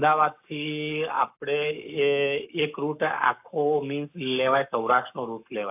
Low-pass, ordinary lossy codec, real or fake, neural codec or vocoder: 3.6 kHz; AAC, 32 kbps; fake; codec, 44.1 kHz, 7.8 kbps, Pupu-Codec